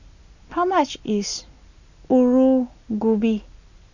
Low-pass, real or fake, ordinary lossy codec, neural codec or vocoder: 7.2 kHz; real; none; none